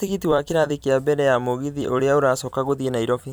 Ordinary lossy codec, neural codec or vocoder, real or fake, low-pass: none; vocoder, 44.1 kHz, 128 mel bands every 256 samples, BigVGAN v2; fake; none